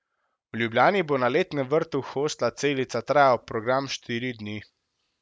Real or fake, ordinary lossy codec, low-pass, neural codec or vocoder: real; none; none; none